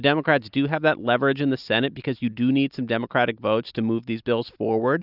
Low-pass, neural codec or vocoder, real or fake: 5.4 kHz; none; real